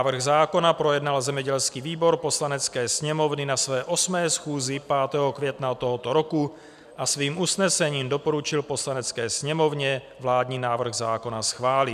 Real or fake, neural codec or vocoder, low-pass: fake; vocoder, 44.1 kHz, 128 mel bands every 256 samples, BigVGAN v2; 14.4 kHz